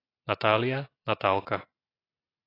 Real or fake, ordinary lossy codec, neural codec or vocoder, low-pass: real; AAC, 24 kbps; none; 5.4 kHz